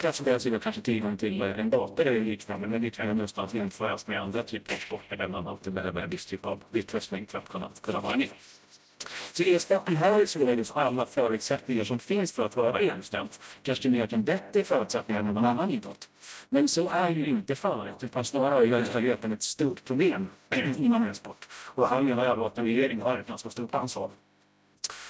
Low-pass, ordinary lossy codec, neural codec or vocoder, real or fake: none; none; codec, 16 kHz, 0.5 kbps, FreqCodec, smaller model; fake